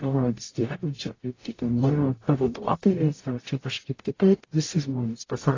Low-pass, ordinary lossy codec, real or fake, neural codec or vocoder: 7.2 kHz; AAC, 32 kbps; fake; codec, 44.1 kHz, 0.9 kbps, DAC